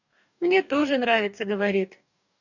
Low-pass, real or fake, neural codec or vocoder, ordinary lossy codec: 7.2 kHz; fake; codec, 44.1 kHz, 2.6 kbps, DAC; none